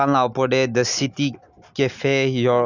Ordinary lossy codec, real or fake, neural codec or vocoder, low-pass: none; real; none; 7.2 kHz